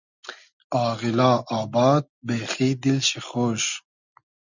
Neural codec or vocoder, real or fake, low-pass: none; real; 7.2 kHz